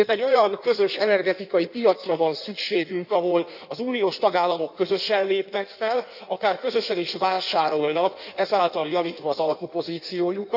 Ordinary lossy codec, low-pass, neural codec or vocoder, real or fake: none; 5.4 kHz; codec, 16 kHz in and 24 kHz out, 1.1 kbps, FireRedTTS-2 codec; fake